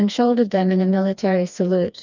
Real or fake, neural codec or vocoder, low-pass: fake; codec, 16 kHz, 2 kbps, FreqCodec, smaller model; 7.2 kHz